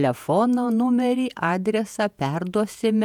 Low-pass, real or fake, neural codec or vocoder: 19.8 kHz; fake; vocoder, 48 kHz, 128 mel bands, Vocos